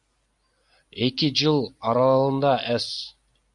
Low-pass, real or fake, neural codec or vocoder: 10.8 kHz; real; none